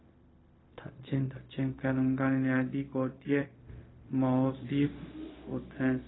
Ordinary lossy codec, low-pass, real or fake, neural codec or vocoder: AAC, 16 kbps; 7.2 kHz; fake; codec, 16 kHz, 0.4 kbps, LongCat-Audio-Codec